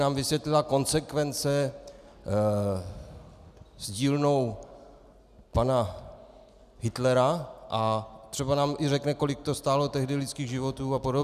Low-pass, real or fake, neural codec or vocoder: 14.4 kHz; real; none